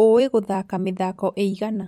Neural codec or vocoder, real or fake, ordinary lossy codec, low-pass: vocoder, 44.1 kHz, 128 mel bands every 256 samples, BigVGAN v2; fake; MP3, 64 kbps; 19.8 kHz